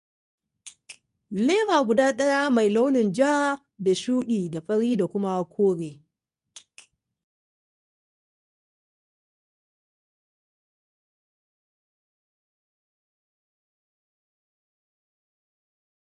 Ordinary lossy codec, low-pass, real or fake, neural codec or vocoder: none; 10.8 kHz; fake; codec, 24 kHz, 0.9 kbps, WavTokenizer, medium speech release version 2